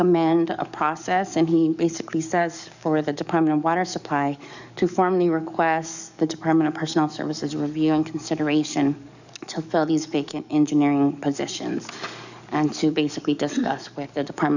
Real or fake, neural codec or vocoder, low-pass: fake; codec, 44.1 kHz, 7.8 kbps, DAC; 7.2 kHz